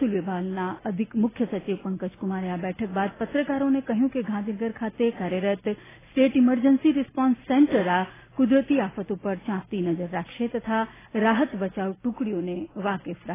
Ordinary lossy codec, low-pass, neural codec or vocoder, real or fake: AAC, 16 kbps; 3.6 kHz; none; real